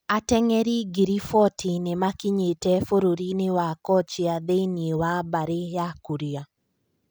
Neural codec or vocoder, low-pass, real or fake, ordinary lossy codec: none; none; real; none